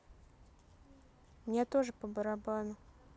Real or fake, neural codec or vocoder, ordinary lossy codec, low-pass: real; none; none; none